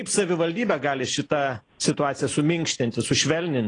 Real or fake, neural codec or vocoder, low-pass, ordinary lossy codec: real; none; 9.9 kHz; AAC, 32 kbps